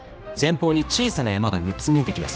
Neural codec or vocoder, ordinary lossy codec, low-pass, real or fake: codec, 16 kHz, 1 kbps, X-Codec, HuBERT features, trained on general audio; none; none; fake